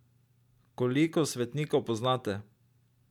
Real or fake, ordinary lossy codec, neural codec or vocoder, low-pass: real; none; none; 19.8 kHz